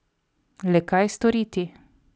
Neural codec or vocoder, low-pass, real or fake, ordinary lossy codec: none; none; real; none